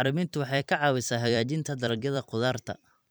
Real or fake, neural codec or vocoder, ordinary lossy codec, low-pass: fake; vocoder, 44.1 kHz, 128 mel bands every 256 samples, BigVGAN v2; none; none